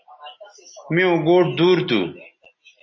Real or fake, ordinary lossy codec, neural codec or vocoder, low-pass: real; MP3, 32 kbps; none; 7.2 kHz